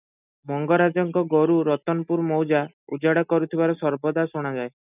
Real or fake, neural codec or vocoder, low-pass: real; none; 3.6 kHz